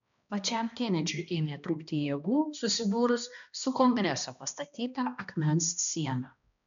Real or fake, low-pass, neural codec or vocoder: fake; 7.2 kHz; codec, 16 kHz, 1 kbps, X-Codec, HuBERT features, trained on balanced general audio